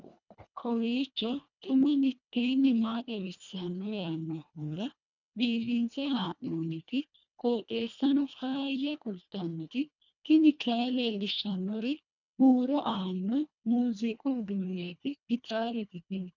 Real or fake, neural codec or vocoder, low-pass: fake; codec, 24 kHz, 1.5 kbps, HILCodec; 7.2 kHz